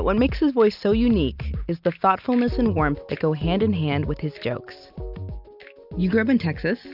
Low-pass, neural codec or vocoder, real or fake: 5.4 kHz; none; real